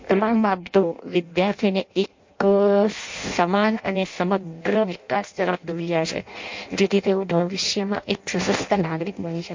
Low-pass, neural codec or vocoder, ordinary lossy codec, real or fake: 7.2 kHz; codec, 16 kHz in and 24 kHz out, 0.6 kbps, FireRedTTS-2 codec; MP3, 48 kbps; fake